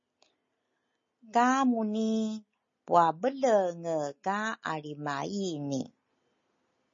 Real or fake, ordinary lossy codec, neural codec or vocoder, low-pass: real; MP3, 32 kbps; none; 7.2 kHz